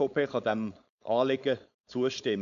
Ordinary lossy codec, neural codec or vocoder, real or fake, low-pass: none; codec, 16 kHz, 4.8 kbps, FACodec; fake; 7.2 kHz